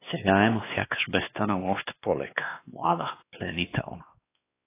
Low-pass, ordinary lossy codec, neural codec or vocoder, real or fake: 3.6 kHz; AAC, 24 kbps; codec, 16 kHz, 2 kbps, X-Codec, WavLM features, trained on Multilingual LibriSpeech; fake